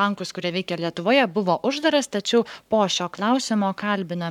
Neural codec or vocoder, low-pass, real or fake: codec, 44.1 kHz, 7.8 kbps, Pupu-Codec; 19.8 kHz; fake